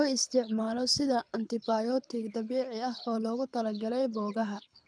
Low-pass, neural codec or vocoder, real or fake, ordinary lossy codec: 9.9 kHz; codec, 24 kHz, 6 kbps, HILCodec; fake; none